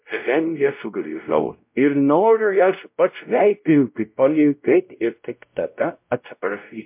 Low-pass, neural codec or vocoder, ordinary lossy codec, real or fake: 3.6 kHz; codec, 16 kHz, 0.5 kbps, X-Codec, WavLM features, trained on Multilingual LibriSpeech; MP3, 32 kbps; fake